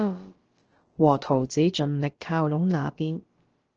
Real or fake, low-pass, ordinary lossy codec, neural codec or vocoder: fake; 7.2 kHz; Opus, 16 kbps; codec, 16 kHz, about 1 kbps, DyCAST, with the encoder's durations